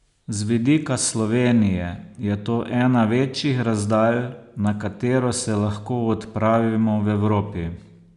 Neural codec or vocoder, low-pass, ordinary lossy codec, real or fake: none; 10.8 kHz; none; real